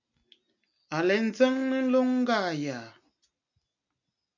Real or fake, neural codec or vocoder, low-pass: real; none; 7.2 kHz